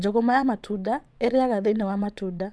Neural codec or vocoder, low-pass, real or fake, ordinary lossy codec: vocoder, 22.05 kHz, 80 mel bands, WaveNeXt; none; fake; none